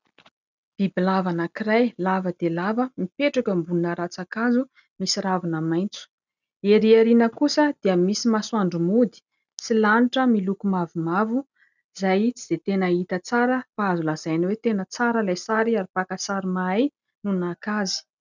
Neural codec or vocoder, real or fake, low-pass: none; real; 7.2 kHz